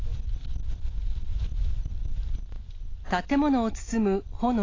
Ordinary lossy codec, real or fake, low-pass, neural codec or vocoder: AAC, 32 kbps; real; 7.2 kHz; none